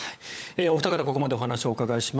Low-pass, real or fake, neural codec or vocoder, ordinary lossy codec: none; fake; codec, 16 kHz, 16 kbps, FunCodec, trained on LibriTTS, 50 frames a second; none